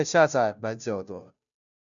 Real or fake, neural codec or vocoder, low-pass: fake; codec, 16 kHz, 0.5 kbps, FunCodec, trained on Chinese and English, 25 frames a second; 7.2 kHz